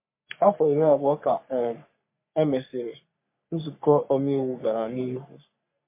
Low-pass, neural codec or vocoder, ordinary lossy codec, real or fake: 3.6 kHz; codec, 44.1 kHz, 3.4 kbps, Pupu-Codec; MP3, 24 kbps; fake